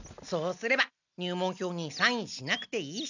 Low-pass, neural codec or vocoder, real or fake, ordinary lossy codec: 7.2 kHz; none; real; none